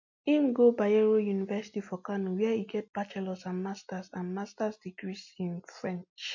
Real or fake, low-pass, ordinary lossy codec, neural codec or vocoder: real; 7.2 kHz; MP3, 48 kbps; none